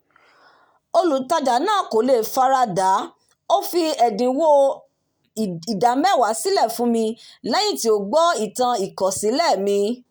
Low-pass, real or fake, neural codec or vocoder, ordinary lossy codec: none; real; none; none